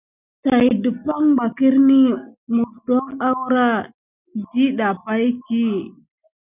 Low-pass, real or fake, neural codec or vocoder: 3.6 kHz; real; none